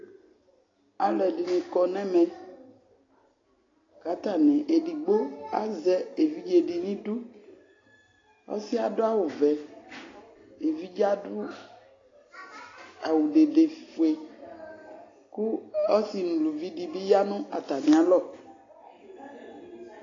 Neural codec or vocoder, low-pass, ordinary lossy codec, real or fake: none; 7.2 kHz; AAC, 32 kbps; real